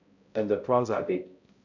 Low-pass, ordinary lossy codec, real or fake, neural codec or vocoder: 7.2 kHz; none; fake; codec, 16 kHz, 0.5 kbps, X-Codec, HuBERT features, trained on balanced general audio